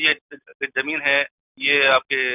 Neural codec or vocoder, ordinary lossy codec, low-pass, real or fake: none; none; 3.6 kHz; real